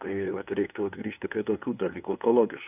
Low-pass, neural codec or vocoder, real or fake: 3.6 kHz; codec, 16 kHz, 2 kbps, FunCodec, trained on LibriTTS, 25 frames a second; fake